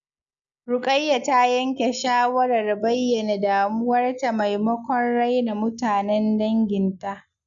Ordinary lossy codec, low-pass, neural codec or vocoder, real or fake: none; 7.2 kHz; none; real